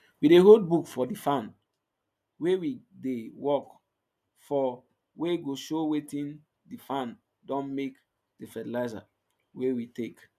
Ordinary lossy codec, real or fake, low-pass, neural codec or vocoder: none; real; 14.4 kHz; none